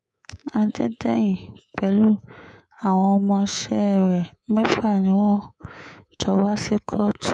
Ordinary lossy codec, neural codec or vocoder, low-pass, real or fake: none; codec, 24 kHz, 3.1 kbps, DualCodec; none; fake